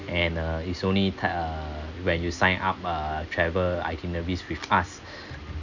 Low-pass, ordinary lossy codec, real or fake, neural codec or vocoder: 7.2 kHz; none; real; none